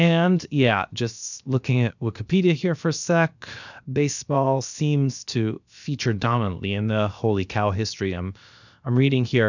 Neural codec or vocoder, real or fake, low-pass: codec, 16 kHz, 0.7 kbps, FocalCodec; fake; 7.2 kHz